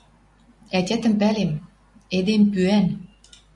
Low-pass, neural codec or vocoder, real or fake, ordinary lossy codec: 10.8 kHz; none; real; MP3, 96 kbps